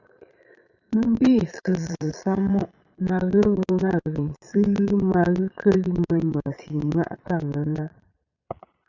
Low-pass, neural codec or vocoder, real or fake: 7.2 kHz; vocoder, 22.05 kHz, 80 mel bands, Vocos; fake